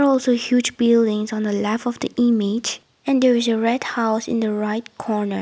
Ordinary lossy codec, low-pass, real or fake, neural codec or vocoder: none; none; real; none